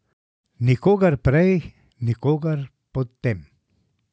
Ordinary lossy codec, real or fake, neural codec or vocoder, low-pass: none; real; none; none